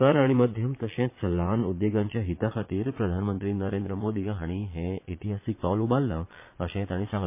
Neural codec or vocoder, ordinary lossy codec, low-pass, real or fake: vocoder, 22.05 kHz, 80 mel bands, Vocos; MP3, 24 kbps; 3.6 kHz; fake